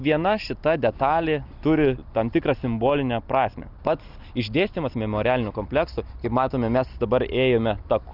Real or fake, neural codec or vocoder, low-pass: real; none; 5.4 kHz